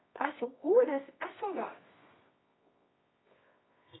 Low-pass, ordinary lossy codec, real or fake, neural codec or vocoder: 7.2 kHz; AAC, 16 kbps; fake; codec, 24 kHz, 0.9 kbps, WavTokenizer, medium music audio release